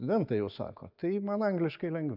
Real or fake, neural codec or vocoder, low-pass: real; none; 5.4 kHz